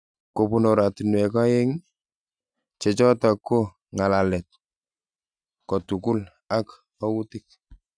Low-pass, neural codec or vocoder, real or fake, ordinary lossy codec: 9.9 kHz; none; real; none